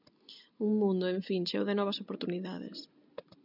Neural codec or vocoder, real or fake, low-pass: none; real; 5.4 kHz